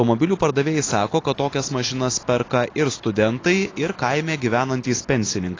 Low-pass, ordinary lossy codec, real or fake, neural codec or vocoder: 7.2 kHz; AAC, 32 kbps; real; none